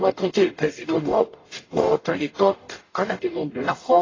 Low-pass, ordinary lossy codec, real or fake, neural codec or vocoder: 7.2 kHz; AAC, 32 kbps; fake; codec, 44.1 kHz, 0.9 kbps, DAC